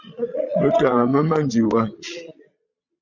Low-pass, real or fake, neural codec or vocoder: 7.2 kHz; fake; vocoder, 22.05 kHz, 80 mel bands, Vocos